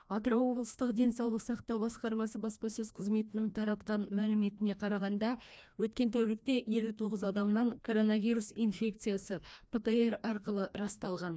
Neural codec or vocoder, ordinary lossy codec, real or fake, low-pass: codec, 16 kHz, 1 kbps, FreqCodec, larger model; none; fake; none